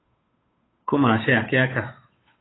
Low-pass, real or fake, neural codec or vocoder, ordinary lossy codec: 7.2 kHz; fake; codec, 16 kHz, 8 kbps, FunCodec, trained on Chinese and English, 25 frames a second; AAC, 16 kbps